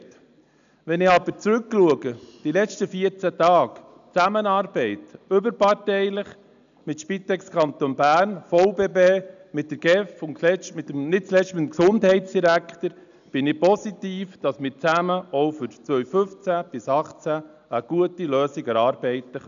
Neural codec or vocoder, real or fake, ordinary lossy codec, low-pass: none; real; none; 7.2 kHz